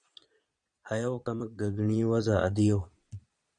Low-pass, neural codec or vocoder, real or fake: 9.9 kHz; vocoder, 22.05 kHz, 80 mel bands, Vocos; fake